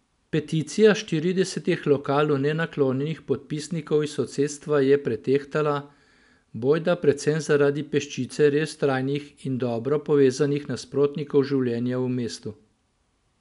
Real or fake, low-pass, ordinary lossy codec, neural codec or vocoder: real; 10.8 kHz; none; none